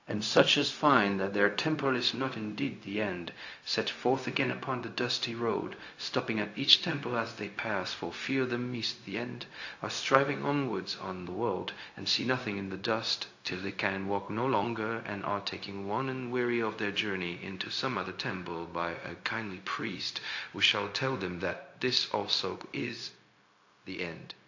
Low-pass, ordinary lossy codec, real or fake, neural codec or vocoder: 7.2 kHz; AAC, 48 kbps; fake; codec, 16 kHz, 0.4 kbps, LongCat-Audio-Codec